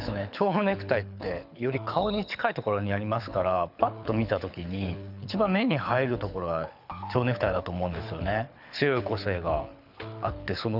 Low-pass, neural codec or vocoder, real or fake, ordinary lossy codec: 5.4 kHz; codec, 24 kHz, 6 kbps, HILCodec; fake; none